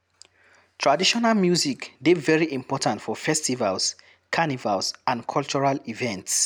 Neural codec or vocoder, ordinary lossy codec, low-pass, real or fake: none; none; none; real